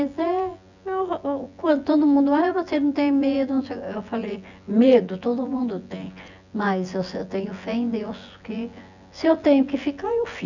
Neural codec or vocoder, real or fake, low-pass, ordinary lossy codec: vocoder, 24 kHz, 100 mel bands, Vocos; fake; 7.2 kHz; none